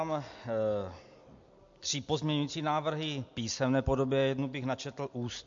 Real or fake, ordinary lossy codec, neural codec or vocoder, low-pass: real; MP3, 48 kbps; none; 7.2 kHz